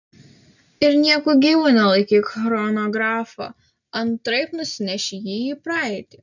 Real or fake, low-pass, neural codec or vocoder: real; 7.2 kHz; none